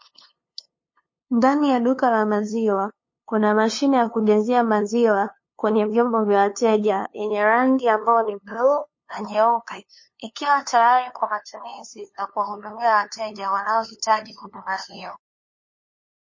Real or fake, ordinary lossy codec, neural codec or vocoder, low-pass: fake; MP3, 32 kbps; codec, 16 kHz, 2 kbps, FunCodec, trained on LibriTTS, 25 frames a second; 7.2 kHz